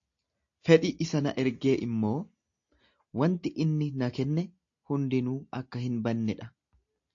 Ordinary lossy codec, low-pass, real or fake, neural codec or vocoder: AAC, 48 kbps; 7.2 kHz; real; none